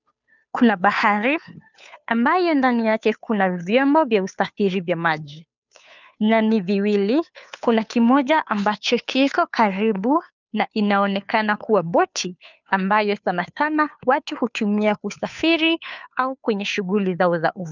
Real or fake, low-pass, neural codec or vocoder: fake; 7.2 kHz; codec, 16 kHz, 2 kbps, FunCodec, trained on Chinese and English, 25 frames a second